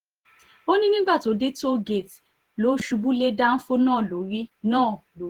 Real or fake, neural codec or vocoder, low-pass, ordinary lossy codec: fake; vocoder, 48 kHz, 128 mel bands, Vocos; 19.8 kHz; Opus, 16 kbps